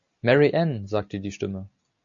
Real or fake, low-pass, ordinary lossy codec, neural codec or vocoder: real; 7.2 kHz; MP3, 96 kbps; none